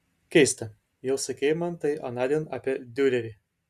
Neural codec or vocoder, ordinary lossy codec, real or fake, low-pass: none; Opus, 64 kbps; real; 14.4 kHz